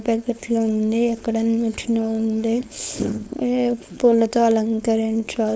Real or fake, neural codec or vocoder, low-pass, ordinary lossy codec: fake; codec, 16 kHz, 4.8 kbps, FACodec; none; none